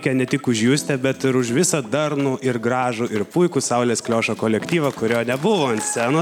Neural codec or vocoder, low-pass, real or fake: none; 19.8 kHz; real